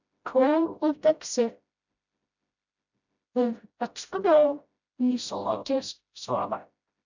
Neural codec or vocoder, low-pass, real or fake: codec, 16 kHz, 0.5 kbps, FreqCodec, smaller model; 7.2 kHz; fake